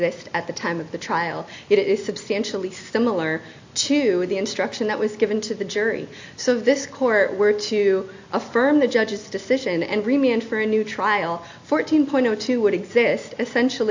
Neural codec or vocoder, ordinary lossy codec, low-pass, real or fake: none; AAC, 48 kbps; 7.2 kHz; real